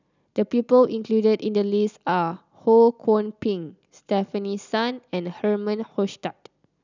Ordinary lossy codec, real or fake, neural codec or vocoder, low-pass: none; real; none; 7.2 kHz